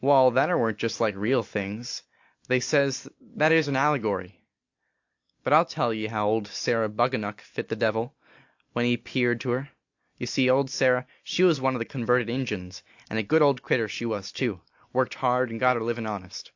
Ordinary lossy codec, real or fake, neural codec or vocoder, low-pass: AAC, 48 kbps; real; none; 7.2 kHz